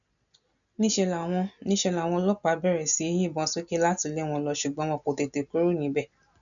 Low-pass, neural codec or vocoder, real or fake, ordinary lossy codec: 7.2 kHz; none; real; none